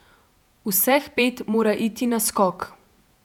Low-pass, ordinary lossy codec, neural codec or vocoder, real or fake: 19.8 kHz; none; vocoder, 48 kHz, 128 mel bands, Vocos; fake